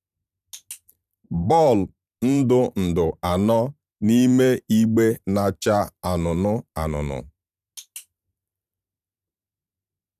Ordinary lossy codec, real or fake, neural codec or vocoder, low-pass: none; fake; vocoder, 44.1 kHz, 128 mel bands every 512 samples, BigVGAN v2; 14.4 kHz